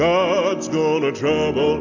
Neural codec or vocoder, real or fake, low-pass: none; real; 7.2 kHz